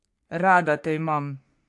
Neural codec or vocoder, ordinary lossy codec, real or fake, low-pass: codec, 44.1 kHz, 3.4 kbps, Pupu-Codec; AAC, 64 kbps; fake; 10.8 kHz